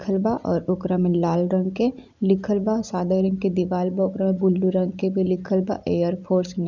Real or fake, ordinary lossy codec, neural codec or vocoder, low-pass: real; none; none; 7.2 kHz